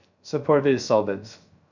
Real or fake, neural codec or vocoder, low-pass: fake; codec, 16 kHz, 0.3 kbps, FocalCodec; 7.2 kHz